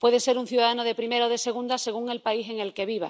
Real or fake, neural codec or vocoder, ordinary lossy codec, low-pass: real; none; none; none